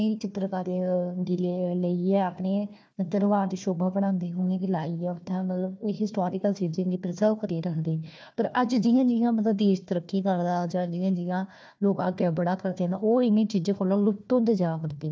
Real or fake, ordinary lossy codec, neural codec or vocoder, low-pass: fake; none; codec, 16 kHz, 1 kbps, FunCodec, trained on Chinese and English, 50 frames a second; none